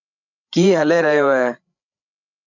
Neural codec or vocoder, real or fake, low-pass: codec, 16 kHz, 8 kbps, FreqCodec, larger model; fake; 7.2 kHz